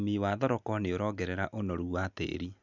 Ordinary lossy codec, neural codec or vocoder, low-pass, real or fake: none; none; 7.2 kHz; real